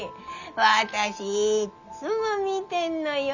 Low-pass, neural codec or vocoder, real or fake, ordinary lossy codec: 7.2 kHz; none; real; none